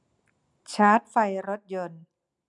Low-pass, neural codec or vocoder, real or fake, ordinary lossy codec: 10.8 kHz; none; real; none